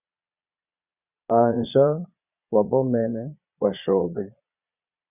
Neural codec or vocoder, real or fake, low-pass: vocoder, 22.05 kHz, 80 mel bands, Vocos; fake; 3.6 kHz